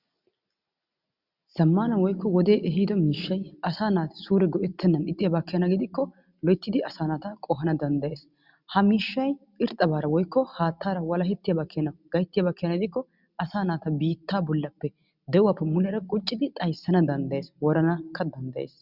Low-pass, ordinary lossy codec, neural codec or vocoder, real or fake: 5.4 kHz; Opus, 64 kbps; none; real